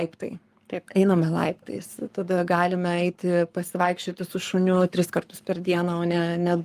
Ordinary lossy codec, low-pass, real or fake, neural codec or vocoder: Opus, 32 kbps; 14.4 kHz; fake; codec, 44.1 kHz, 7.8 kbps, Pupu-Codec